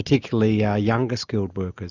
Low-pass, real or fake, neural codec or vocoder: 7.2 kHz; real; none